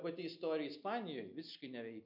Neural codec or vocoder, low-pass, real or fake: none; 5.4 kHz; real